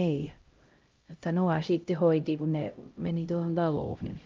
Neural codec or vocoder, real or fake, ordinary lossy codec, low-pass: codec, 16 kHz, 0.5 kbps, X-Codec, HuBERT features, trained on LibriSpeech; fake; Opus, 24 kbps; 7.2 kHz